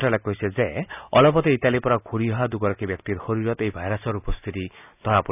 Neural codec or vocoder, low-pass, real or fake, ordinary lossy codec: none; 3.6 kHz; real; none